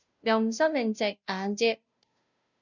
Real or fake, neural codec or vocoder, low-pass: fake; codec, 16 kHz, 0.5 kbps, FunCodec, trained on Chinese and English, 25 frames a second; 7.2 kHz